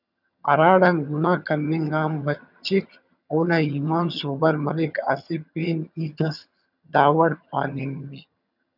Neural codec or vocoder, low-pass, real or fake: vocoder, 22.05 kHz, 80 mel bands, HiFi-GAN; 5.4 kHz; fake